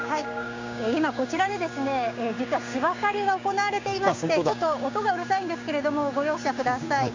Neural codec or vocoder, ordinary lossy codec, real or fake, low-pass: codec, 16 kHz, 6 kbps, DAC; MP3, 64 kbps; fake; 7.2 kHz